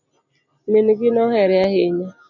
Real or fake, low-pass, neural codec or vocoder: real; 7.2 kHz; none